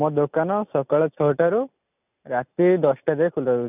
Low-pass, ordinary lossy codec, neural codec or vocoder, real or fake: 3.6 kHz; none; none; real